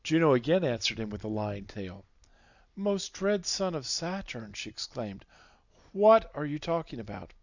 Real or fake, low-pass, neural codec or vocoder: real; 7.2 kHz; none